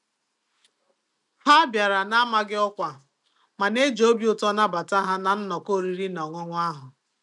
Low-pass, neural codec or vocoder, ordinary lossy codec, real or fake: 10.8 kHz; none; none; real